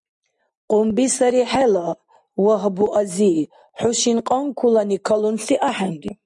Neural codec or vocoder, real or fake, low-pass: none; real; 10.8 kHz